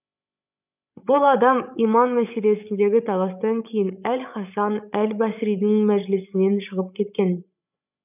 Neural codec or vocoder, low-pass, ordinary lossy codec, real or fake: codec, 16 kHz, 16 kbps, FreqCodec, larger model; 3.6 kHz; none; fake